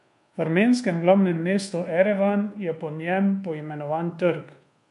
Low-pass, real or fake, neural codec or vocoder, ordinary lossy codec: 10.8 kHz; fake; codec, 24 kHz, 1.2 kbps, DualCodec; MP3, 64 kbps